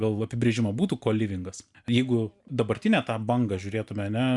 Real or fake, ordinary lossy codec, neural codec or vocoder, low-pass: real; MP3, 96 kbps; none; 10.8 kHz